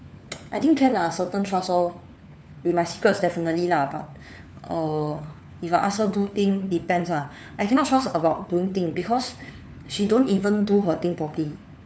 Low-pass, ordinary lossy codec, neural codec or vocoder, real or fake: none; none; codec, 16 kHz, 4 kbps, FunCodec, trained on LibriTTS, 50 frames a second; fake